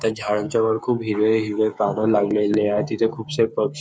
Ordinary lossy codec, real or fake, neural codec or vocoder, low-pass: none; fake; codec, 16 kHz, 8 kbps, FreqCodec, smaller model; none